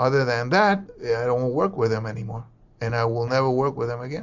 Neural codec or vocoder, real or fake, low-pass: none; real; 7.2 kHz